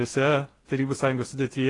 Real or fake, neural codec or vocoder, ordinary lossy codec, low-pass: fake; codec, 16 kHz in and 24 kHz out, 0.8 kbps, FocalCodec, streaming, 65536 codes; AAC, 32 kbps; 10.8 kHz